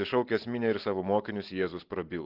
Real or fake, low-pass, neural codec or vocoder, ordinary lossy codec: real; 5.4 kHz; none; Opus, 16 kbps